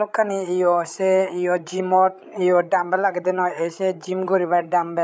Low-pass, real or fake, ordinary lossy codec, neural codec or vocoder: none; fake; none; codec, 16 kHz, 16 kbps, FreqCodec, larger model